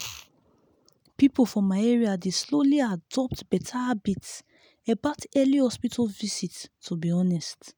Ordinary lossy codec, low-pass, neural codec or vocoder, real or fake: none; none; none; real